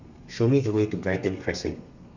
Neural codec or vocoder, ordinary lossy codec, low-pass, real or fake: codec, 32 kHz, 1.9 kbps, SNAC; Opus, 64 kbps; 7.2 kHz; fake